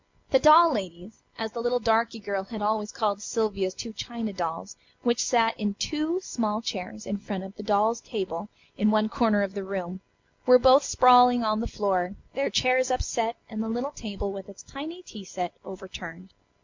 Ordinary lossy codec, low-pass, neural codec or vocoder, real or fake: MP3, 48 kbps; 7.2 kHz; none; real